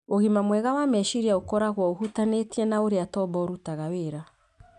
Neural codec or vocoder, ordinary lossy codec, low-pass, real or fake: none; none; 10.8 kHz; real